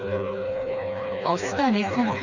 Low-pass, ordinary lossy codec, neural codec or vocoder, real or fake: 7.2 kHz; none; codec, 16 kHz, 2 kbps, FreqCodec, smaller model; fake